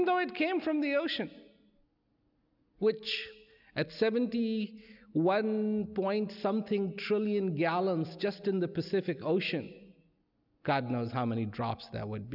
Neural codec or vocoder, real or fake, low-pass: none; real; 5.4 kHz